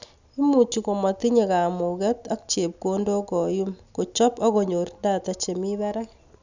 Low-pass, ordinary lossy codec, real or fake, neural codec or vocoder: 7.2 kHz; none; real; none